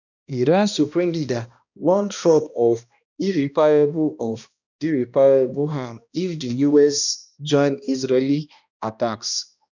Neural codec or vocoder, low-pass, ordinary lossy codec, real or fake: codec, 16 kHz, 1 kbps, X-Codec, HuBERT features, trained on balanced general audio; 7.2 kHz; none; fake